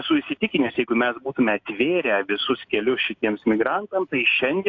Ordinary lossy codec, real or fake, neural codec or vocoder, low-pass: AAC, 48 kbps; real; none; 7.2 kHz